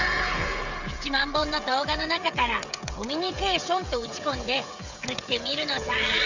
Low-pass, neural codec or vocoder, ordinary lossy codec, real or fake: 7.2 kHz; codec, 16 kHz, 16 kbps, FreqCodec, smaller model; Opus, 64 kbps; fake